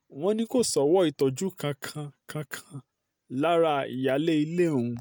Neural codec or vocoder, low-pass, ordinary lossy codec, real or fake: none; none; none; real